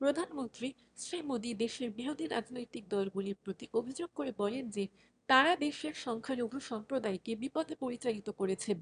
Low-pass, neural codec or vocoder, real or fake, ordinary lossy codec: 9.9 kHz; autoencoder, 22.05 kHz, a latent of 192 numbers a frame, VITS, trained on one speaker; fake; none